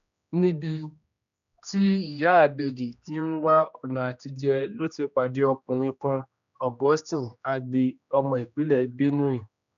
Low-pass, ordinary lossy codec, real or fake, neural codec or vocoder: 7.2 kHz; none; fake; codec, 16 kHz, 1 kbps, X-Codec, HuBERT features, trained on general audio